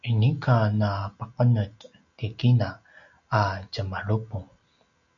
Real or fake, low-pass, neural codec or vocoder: real; 7.2 kHz; none